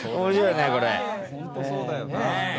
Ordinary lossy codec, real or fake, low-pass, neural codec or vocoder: none; real; none; none